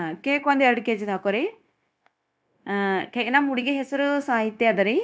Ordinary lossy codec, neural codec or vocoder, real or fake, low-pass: none; codec, 16 kHz, 0.9 kbps, LongCat-Audio-Codec; fake; none